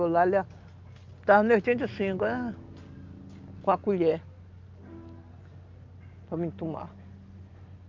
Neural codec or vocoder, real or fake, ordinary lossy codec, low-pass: none; real; Opus, 24 kbps; 7.2 kHz